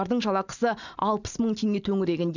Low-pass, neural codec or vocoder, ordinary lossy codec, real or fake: 7.2 kHz; none; none; real